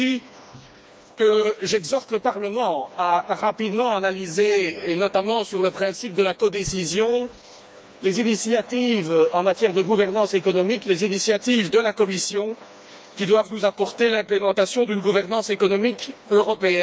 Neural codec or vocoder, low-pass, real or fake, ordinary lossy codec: codec, 16 kHz, 2 kbps, FreqCodec, smaller model; none; fake; none